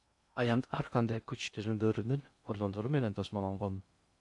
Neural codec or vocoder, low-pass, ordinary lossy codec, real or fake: codec, 16 kHz in and 24 kHz out, 0.6 kbps, FocalCodec, streaming, 2048 codes; 10.8 kHz; MP3, 64 kbps; fake